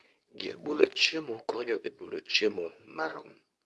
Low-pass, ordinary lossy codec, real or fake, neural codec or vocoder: none; none; fake; codec, 24 kHz, 0.9 kbps, WavTokenizer, medium speech release version 2